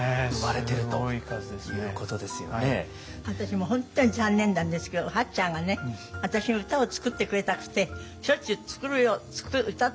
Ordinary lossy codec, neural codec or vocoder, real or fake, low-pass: none; none; real; none